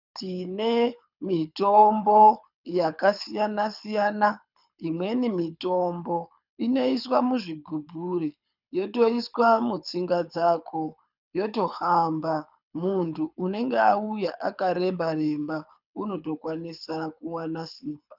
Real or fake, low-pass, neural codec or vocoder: fake; 5.4 kHz; codec, 24 kHz, 6 kbps, HILCodec